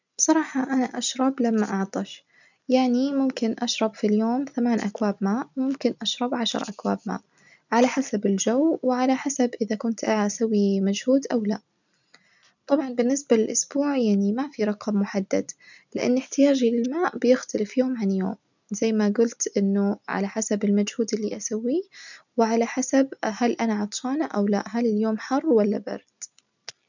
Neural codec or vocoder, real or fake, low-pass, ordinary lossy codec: none; real; 7.2 kHz; none